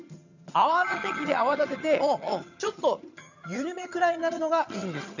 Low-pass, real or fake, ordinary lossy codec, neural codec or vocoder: 7.2 kHz; fake; none; vocoder, 22.05 kHz, 80 mel bands, HiFi-GAN